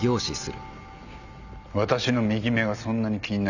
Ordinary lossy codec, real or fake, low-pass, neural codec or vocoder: none; real; 7.2 kHz; none